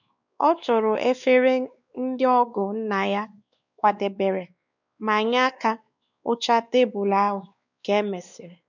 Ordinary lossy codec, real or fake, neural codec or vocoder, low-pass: none; fake; codec, 16 kHz, 2 kbps, X-Codec, WavLM features, trained on Multilingual LibriSpeech; 7.2 kHz